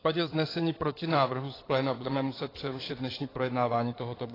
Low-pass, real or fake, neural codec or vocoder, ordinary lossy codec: 5.4 kHz; fake; codec, 16 kHz in and 24 kHz out, 2.2 kbps, FireRedTTS-2 codec; AAC, 24 kbps